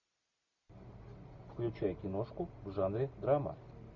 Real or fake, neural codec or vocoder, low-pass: real; none; 7.2 kHz